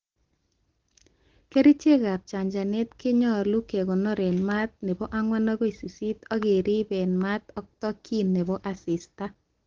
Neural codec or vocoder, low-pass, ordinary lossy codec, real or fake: none; 7.2 kHz; Opus, 16 kbps; real